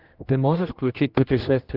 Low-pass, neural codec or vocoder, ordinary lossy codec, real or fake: 5.4 kHz; codec, 16 kHz, 1 kbps, X-Codec, HuBERT features, trained on general audio; Opus, 32 kbps; fake